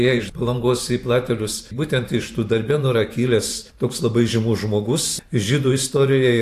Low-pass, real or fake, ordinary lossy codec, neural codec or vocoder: 14.4 kHz; fake; AAC, 64 kbps; vocoder, 44.1 kHz, 128 mel bands every 512 samples, BigVGAN v2